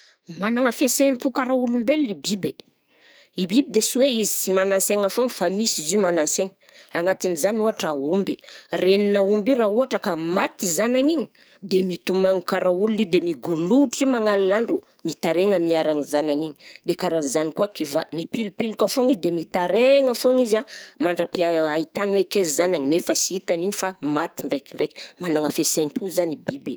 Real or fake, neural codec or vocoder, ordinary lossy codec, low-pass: fake; codec, 44.1 kHz, 2.6 kbps, SNAC; none; none